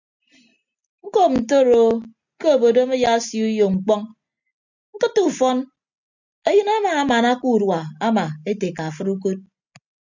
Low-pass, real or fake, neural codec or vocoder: 7.2 kHz; real; none